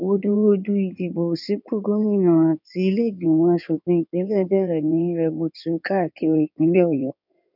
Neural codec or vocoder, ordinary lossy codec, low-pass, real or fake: codec, 16 kHz in and 24 kHz out, 2.2 kbps, FireRedTTS-2 codec; none; 5.4 kHz; fake